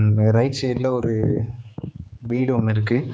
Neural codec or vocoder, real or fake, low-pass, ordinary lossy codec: codec, 16 kHz, 4 kbps, X-Codec, HuBERT features, trained on general audio; fake; none; none